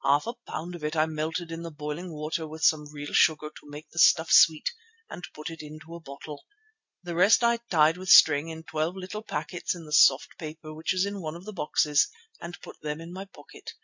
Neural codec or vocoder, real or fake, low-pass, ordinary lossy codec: none; real; 7.2 kHz; MP3, 64 kbps